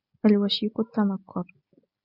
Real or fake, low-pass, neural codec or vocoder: real; 5.4 kHz; none